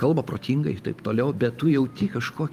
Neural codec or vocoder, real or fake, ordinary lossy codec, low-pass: vocoder, 44.1 kHz, 128 mel bands every 512 samples, BigVGAN v2; fake; Opus, 32 kbps; 14.4 kHz